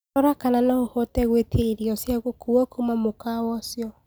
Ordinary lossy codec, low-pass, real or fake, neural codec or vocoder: none; none; real; none